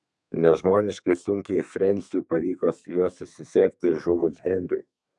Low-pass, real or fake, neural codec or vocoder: 10.8 kHz; fake; codec, 32 kHz, 1.9 kbps, SNAC